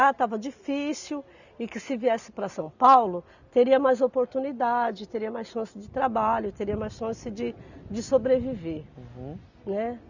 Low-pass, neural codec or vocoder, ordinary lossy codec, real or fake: 7.2 kHz; none; none; real